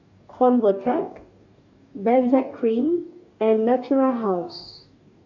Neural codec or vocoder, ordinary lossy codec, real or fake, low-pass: codec, 44.1 kHz, 2.6 kbps, DAC; MP3, 64 kbps; fake; 7.2 kHz